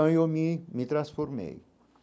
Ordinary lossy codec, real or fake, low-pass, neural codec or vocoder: none; real; none; none